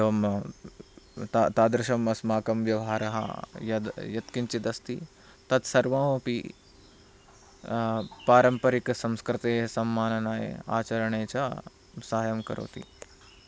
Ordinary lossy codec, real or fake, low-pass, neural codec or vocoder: none; real; none; none